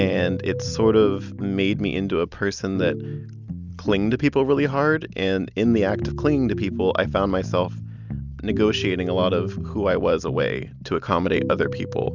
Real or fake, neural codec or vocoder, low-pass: real; none; 7.2 kHz